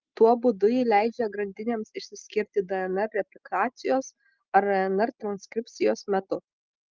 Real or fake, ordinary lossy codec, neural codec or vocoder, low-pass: real; Opus, 32 kbps; none; 7.2 kHz